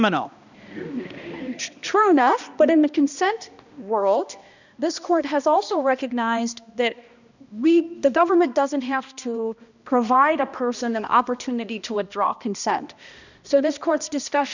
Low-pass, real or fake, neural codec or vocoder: 7.2 kHz; fake; codec, 16 kHz, 1 kbps, X-Codec, HuBERT features, trained on balanced general audio